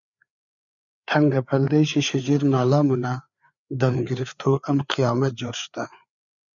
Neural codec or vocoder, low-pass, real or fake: codec, 16 kHz, 4 kbps, FreqCodec, larger model; 7.2 kHz; fake